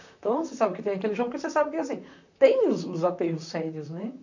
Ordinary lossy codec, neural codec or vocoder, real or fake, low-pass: none; vocoder, 44.1 kHz, 128 mel bands, Pupu-Vocoder; fake; 7.2 kHz